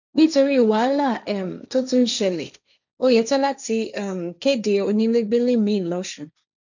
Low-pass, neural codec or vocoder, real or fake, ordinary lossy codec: 7.2 kHz; codec, 16 kHz, 1.1 kbps, Voila-Tokenizer; fake; none